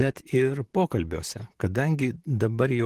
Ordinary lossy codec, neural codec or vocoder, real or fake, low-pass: Opus, 16 kbps; vocoder, 44.1 kHz, 128 mel bands, Pupu-Vocoder; fake; 14.4 kHz